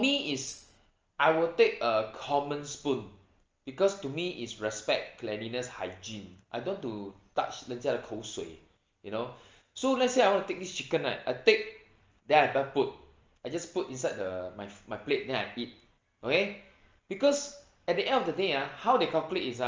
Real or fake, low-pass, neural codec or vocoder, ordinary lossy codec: real; 7.2 kHz; none; Opus, 24 kbps